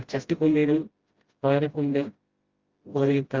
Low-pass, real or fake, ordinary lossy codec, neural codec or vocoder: 7.2 kHz; fake; Opus, 32 kbps; codec, 16 kHz, 0.5 kbps, FreqCodec, smaller model